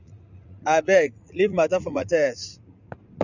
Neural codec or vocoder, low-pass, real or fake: vocoder, 44.1 kHz, 80 mel bands, Vocos; 7.2 kHz; fake